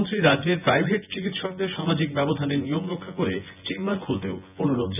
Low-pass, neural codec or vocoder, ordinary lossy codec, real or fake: 3.6 kHz; vocoder, 24 kHz, 100 mel bands, Vocos; none; fake